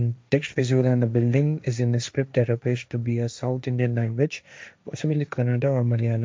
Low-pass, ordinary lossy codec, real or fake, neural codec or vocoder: none; none; fake; codec, 16 kHz, 1.1 kbps, Voila-Tokenizer